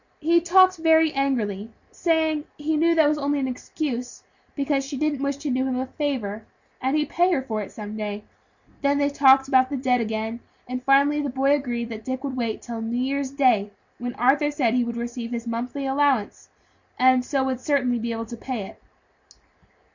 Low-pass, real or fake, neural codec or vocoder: 7.2 kHz; real; none